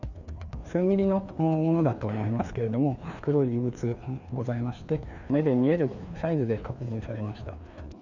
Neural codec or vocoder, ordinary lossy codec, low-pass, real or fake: codec, 16 kHz, 2 kbps, FreqCodec, larger model; none; 7.2 kHz; fake